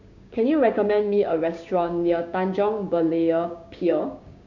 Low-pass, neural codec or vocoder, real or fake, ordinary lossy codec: 7.2 kHz; codec, 16 kHz, 6 kbps, DAC; fake; none